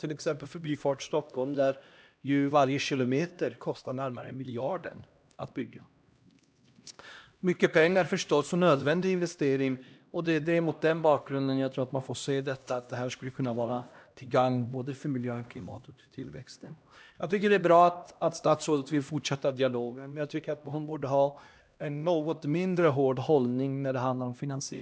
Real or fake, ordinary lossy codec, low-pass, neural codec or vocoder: fake; none; none; codec, 16 kHz, 1 kbps, X-Codec, HuBERT features, trained on LibriSpeech